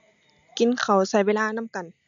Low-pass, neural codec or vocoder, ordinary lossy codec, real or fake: 7.2 kHz; none; none; real